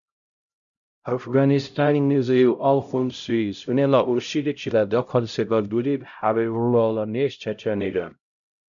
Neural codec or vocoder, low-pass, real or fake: codec, 16 kHz, 0.5 kbps, X-Codec, HuBERT features, trained on LibriSpeech; 7.2 kHz; fake